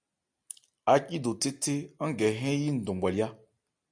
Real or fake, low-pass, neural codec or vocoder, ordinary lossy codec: real; 9.9 kHz; none; MP3, 96 kbps